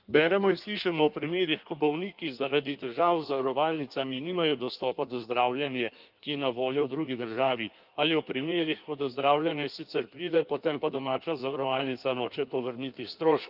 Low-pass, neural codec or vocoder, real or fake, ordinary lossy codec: 5.4 kHz; codec, 16 kHz in and 24 kHz out, 1.1 kbps, FireRedTTS-2 codec; fake; Opus, 24 kbps